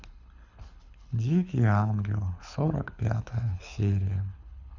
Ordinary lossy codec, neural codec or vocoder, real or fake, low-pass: Opus, 64 kbps; codec, 24 kHz, 6 kbps, HILCodec; fake; 7.2 kHz